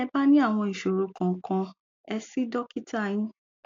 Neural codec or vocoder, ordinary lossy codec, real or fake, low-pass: none; AAC, 48 kbps; real; 7.2 kHz